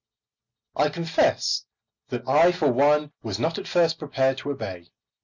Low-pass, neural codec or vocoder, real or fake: 7.2 kHz; none; real